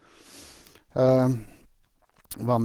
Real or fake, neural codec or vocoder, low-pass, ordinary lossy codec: fake; codec, 44.1 kHz, 7.8 kbps, DAC; 19.8 kHz; Opus, 16 kbps